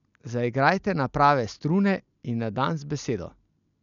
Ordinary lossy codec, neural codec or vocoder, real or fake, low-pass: none; none; real; 7.2 kHz